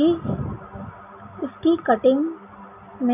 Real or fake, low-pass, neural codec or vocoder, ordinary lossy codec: real; 3.6 kHz; none; none